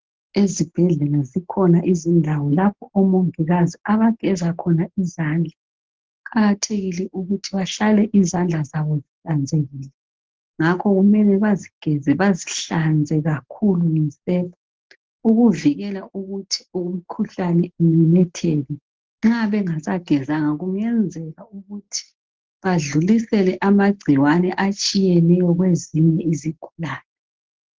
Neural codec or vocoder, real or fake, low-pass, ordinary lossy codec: none; real; 7.2 kHz; Opus, 16 kbps